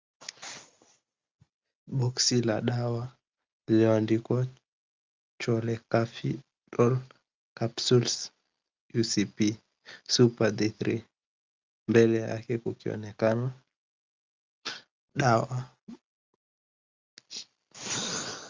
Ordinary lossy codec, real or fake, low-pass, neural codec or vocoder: Opus, 32 kbps; real; 7.2 kHz; none